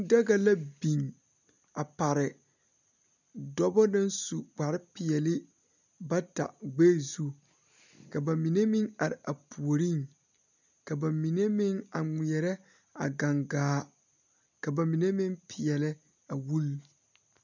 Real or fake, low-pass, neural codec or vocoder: real; 7.2 kHz; none